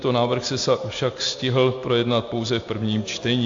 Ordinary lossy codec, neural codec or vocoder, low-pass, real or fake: AAC, 48 kbps; none; 7.2 kHz; real